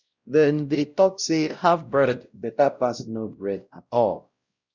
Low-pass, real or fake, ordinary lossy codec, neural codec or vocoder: 7.2 kHz; fake; Opus, 64 kbps; codec, 16 kHz, 0.5 kbps, X-Codec, WavLM features, trained on Multilingual LibriSpeech